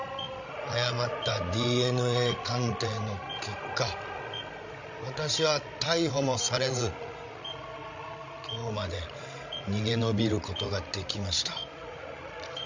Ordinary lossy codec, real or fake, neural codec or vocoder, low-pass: MP3, 64 kbps; fake; codec, 16 kHz, 16 kbps, FreqCodec, larger model; 7.2 kHz